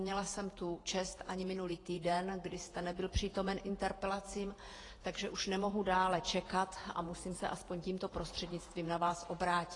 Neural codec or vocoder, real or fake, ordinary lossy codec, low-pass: vocoder, 48 kHz, 128 mel bands, Vocos; fake; AAC, 32 kbps; 10.8 kHz